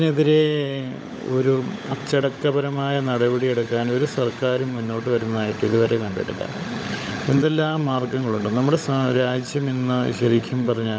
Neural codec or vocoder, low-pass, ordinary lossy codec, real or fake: codec, 16 kHz, 16 kbps, FunCodec, trained on LibriTTS, 50 frames a second; none; none; fake